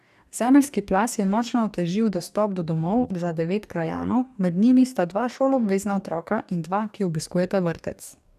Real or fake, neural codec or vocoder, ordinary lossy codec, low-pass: fake; codec, 44.1 kHz, 2.6 kbps, DAC; none; 14.4 kHz